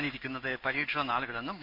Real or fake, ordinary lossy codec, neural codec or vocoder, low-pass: fake; none; codec, 16 kHz in and 24 kHz out, 1 kbps, XY-Tokenizer; 5.4 kHz